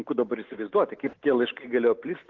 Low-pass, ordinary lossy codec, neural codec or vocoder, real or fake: 7.2 kHz; Opus, 16 kbps; none; real